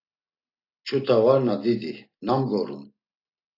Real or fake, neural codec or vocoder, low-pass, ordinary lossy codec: real; none; 5.4 kHz; AAC, 32 kbps